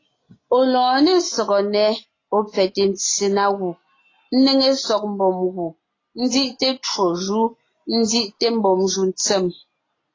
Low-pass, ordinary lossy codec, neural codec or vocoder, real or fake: 7.2 kHz; AAC, 32 kbps; none; real